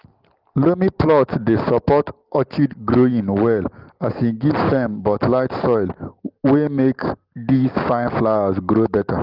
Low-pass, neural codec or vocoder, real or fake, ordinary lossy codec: 5.4 kHz; none; real; Opus, 16 kbps